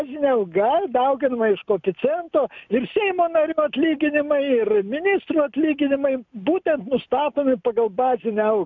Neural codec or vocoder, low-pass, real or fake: none; 7.2 kHz; real